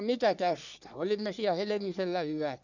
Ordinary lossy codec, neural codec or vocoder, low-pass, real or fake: none; codec, 44.1 kHz, 3.4 kbps, Pupu-Codec; 7.2 kHz; fake